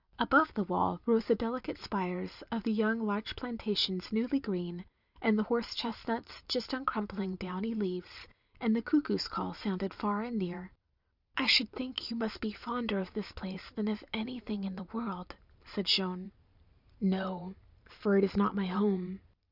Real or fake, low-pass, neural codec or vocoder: fake; 5.4 kHz; vocoder, 44.1 kHz, 128 mel bands every 512 samples, BigVGAN v2